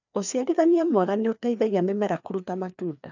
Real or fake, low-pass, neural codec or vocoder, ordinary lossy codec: fake; 7.2 kHz; codec, 16 kHz, 2 kbps, FreqCodec, larger model; none